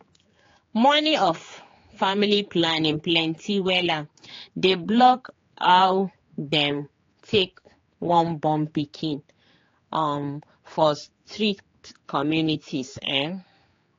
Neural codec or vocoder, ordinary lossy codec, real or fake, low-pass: codec, 16 kHz, 4 kbps, X-Codec, HuBERT features, trained on general audio; AAC, 32 kbps; fake; 7.2 kHz